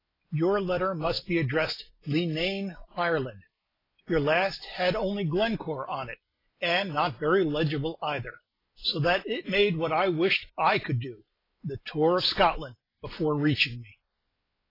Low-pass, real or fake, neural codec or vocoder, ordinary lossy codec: 5.4 kHz; real; none; AAC, 24 kbps